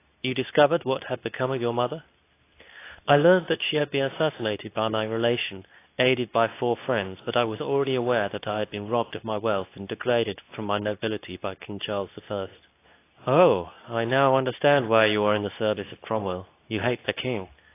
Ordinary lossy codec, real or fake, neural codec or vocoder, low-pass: AAC, 24 kbps; fake; codec, 24 kHz, 0.9 kbps, WavTokenizer, medium speech release version 2; 3.6 kHz